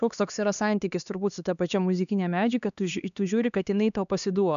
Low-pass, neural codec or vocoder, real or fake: 7.2 kHz; codec, 16 kHz, 2 kbps, X-Codec, HuBERT features, trained on LibriSpeech; fake